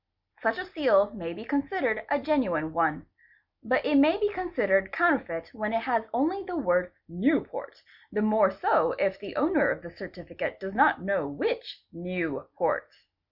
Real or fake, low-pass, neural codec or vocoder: real; 5.4 kHz; none